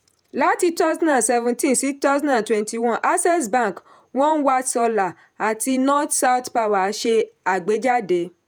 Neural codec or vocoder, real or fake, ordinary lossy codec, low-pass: vocoder, 48 kHz, 128 mel bands, Vocos; fake; none; none